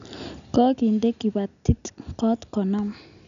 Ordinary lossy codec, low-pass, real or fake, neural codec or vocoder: none; 7.2 kHz; real; none